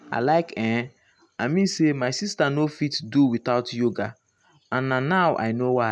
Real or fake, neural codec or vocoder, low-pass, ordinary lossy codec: real; none; none; none